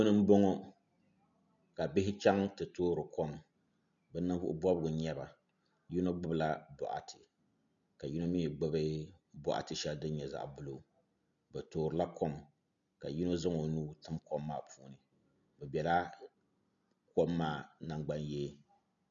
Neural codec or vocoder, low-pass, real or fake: none; 7.2 kHz; real